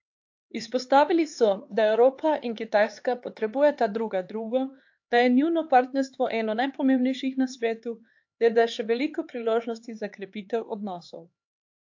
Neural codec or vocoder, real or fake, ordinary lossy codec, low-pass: codec, 16 kHz, 4 kbps, X-Codec, HuBERT features, trained on LibriSpeech; fake; AAC, 48 kbps; 7.2 kHz